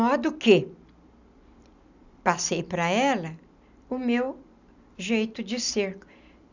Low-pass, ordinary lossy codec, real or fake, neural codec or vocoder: 7.2 kHz; none; real; none